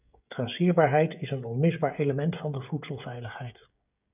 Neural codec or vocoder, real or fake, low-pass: codec, 16 kHz, 16 kbps, FreqCodec, smaller model; fake; 3.6 kHz